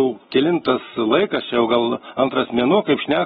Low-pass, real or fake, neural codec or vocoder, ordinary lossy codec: 19.8 kHz; real; none; AAC, 16 kbps